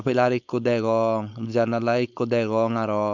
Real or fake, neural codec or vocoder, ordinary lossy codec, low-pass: fake; codec, 16 kHz, 4.8 kbps, FACodec; none; 7.2 kHz